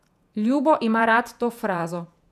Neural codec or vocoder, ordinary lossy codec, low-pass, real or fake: vocoder, 48 kHz, 128 mel bands, Vocos; none; 14.4 kHz; fake